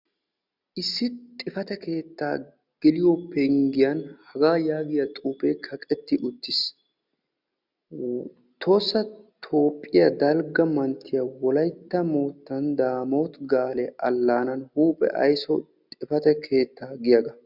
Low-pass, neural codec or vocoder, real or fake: 5.4 kHz; none; real